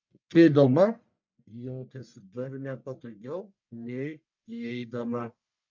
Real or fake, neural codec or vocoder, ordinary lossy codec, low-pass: fake; codec, 44.1 kHz, 1.7 kbps, Pupu-Codec; MP3, 64 kbps; 7.2 kHz